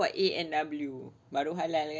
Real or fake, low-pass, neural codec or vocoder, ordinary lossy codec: real; none; none; none